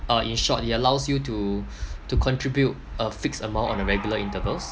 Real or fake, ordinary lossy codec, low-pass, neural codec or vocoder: real; none; none; none